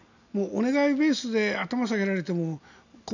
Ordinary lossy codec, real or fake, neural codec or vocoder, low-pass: none; real; none; 7.2 kHz